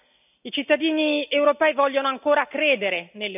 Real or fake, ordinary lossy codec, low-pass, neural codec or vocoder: real; none; 3.6 kHz; none